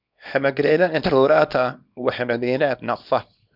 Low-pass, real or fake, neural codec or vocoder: 5.4 kHz; fake; codec, 24 kHz, 0.9 kbps, WavTokenizer, small release